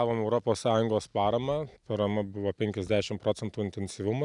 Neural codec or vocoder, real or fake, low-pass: none; real; 10.8 kHz